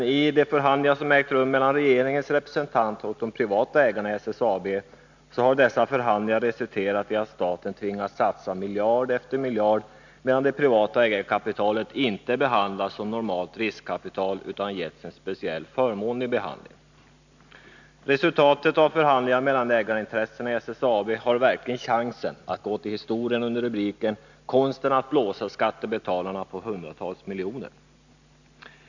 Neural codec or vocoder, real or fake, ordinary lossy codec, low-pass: none; real; none; 7.2 kHz